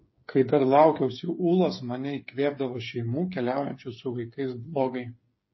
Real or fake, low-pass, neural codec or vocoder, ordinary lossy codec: fake; 7.2 kHz; codec, 16 kHz, 8 kbps, FreqCodec, smaller model; MP3, 24 kbps